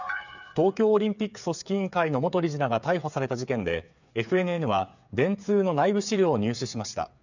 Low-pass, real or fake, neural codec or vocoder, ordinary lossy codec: 7.2 kHz; fake; codec, 16 kHz, 8 kbps, FreqCodec, smaller model; none